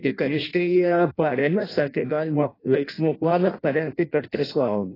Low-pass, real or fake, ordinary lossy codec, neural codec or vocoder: 5.4 kHz; fake; AAC, 24 kbps; codec, 16 kHz in and 24 kHz out, 0.6 kbps, FireRedTTS-2 codec